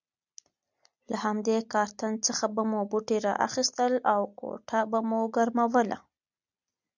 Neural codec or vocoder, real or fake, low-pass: none; real; 7.2 kHz